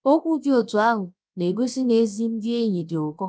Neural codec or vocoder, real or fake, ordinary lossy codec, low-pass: codec, 16 kHz, 0.7 kbps, FocalCodec; fake; none; none